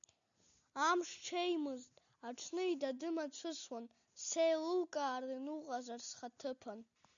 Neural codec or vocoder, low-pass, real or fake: none; 7.2 kHz; real